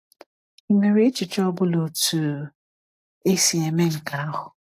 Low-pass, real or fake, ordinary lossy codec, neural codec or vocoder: 14.4 kHz; real; AAC, 64 kbps; none